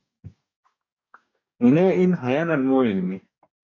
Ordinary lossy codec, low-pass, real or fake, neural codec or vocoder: MP3, 64 kbps; 7.2 kHz; fake; codec, 44.1 kHz, 2.6 kbps, DAC